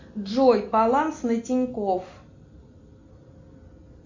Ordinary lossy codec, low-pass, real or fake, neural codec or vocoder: MP3, 48 kbps; 7.2 kHz; real; none